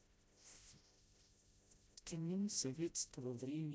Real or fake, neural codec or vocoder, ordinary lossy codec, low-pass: fake; codec, 16 kHz, 0.5 kbps, FreqCodec, smaller model; none; none